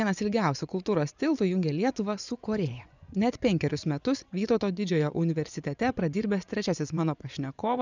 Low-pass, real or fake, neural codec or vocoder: 7.2 kHz; fake; vocoder, 24 kHz, 100 mel bands, Vocos